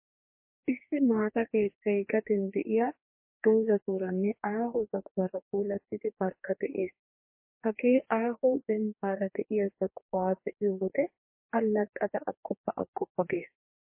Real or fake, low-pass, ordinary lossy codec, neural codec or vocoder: fake; 3.6 kHz; MP3, 24 kbps; codec, 44.1 kHz, 2.6 kbps, DAC